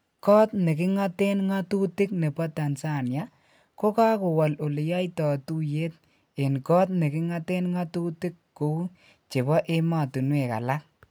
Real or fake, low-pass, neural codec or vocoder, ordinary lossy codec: real; none; none; none